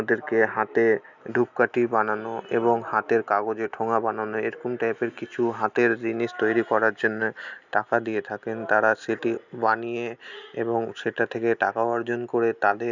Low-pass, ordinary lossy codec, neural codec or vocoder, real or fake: 7.2 kHz; none; none; real